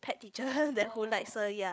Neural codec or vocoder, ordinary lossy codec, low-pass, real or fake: codec, 16 kHz, 8 kbps, FreqCodec, larger model; none; none; fake